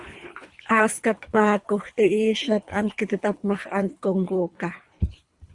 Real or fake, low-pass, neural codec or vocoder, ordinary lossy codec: fake; 10.8 kHz; codec, 24 kHz, 3 kbps, HILCodec; Opus, 64 kbps